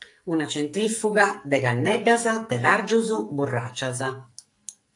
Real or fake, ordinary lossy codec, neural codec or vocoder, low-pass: fake; AAC, 64 kbps; codec, 44.1 kHz, 2.6 kbps, SNAC; 10.8 kHz